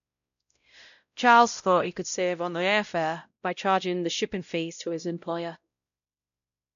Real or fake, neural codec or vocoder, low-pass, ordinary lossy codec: fake; codec, 16 kHz, 0.5 kbps, X-Codec, WavLM features, trained on Multilingual LibriSpeech; 7.2 kHz; none